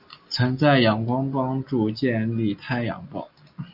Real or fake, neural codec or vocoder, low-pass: real; none; 5.4 kHz